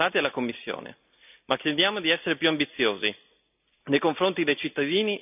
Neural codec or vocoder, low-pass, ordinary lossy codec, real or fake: none; 3.6 kHz; none; real